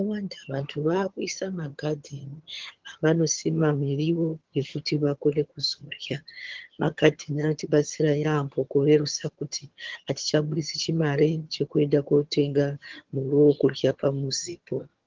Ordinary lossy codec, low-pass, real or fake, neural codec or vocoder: Opus, 16 kbps; 7.2 kHz; fake; vocoder, 22.05 kHz, 80 mel bands, HiFi-GAN